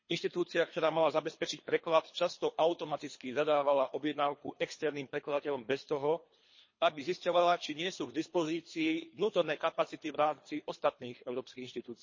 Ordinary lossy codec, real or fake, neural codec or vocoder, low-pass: MP3, 32 kbps; fake; codec, 24 kHz, 3 kbps, HILCodec; 7.2 kHz